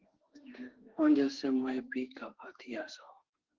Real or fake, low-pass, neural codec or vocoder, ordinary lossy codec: fake; 7.2 kHz; autoencoder, 48 kHz, 32 numbers a frame, DAC-VAE, trained on Japanese speech; Opus, 16 kbps